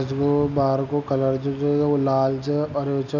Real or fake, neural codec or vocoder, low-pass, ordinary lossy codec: real; none; 7.2 kHz; none